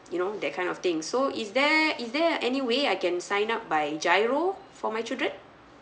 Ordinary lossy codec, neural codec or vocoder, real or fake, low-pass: none; none; real; none